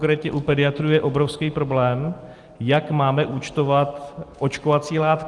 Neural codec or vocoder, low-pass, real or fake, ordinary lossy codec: none; 10.8 kHz; real; Opus, 24 kbps